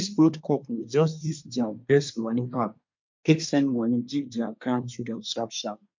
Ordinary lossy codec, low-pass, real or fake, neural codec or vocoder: MP3, 48 kbps; 7.2 kHz; fake; codec, 24 kHz, 1 kbps, SNAC